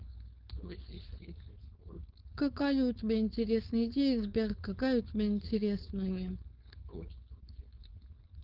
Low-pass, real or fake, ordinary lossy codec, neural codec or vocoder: 5.4 kHz; fake; Opus, 32 kbps; codec, 16 kHz, 4.8 kbps, FACodec